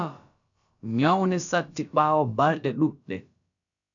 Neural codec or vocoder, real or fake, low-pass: codec, 16 kHz, about 1 kbps, DyCAST, with the encoder's durations; fake; 7.2 kHz